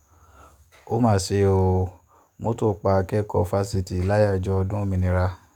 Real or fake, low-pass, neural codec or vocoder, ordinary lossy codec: fake; 19.8 kHz; codec, 44.1 kHz, 7.8 kbps, DAC; none